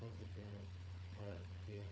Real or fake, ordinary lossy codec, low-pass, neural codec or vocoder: fake; none; none; codec, 16 kHz, 4 kbps, FunCodec, trained on Chinese and English, 50 frames a second